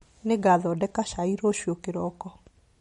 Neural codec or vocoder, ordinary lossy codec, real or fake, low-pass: none; MP3, 48 kbps; real; 19.8 kHz